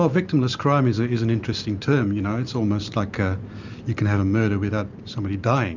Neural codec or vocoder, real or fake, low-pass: none; real; 7.2 kHz